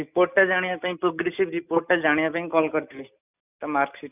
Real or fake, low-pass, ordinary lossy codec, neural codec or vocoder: real; 3.6 kHz; none; none